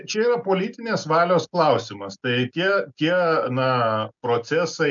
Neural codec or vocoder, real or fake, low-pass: none; real; 7.2 kHz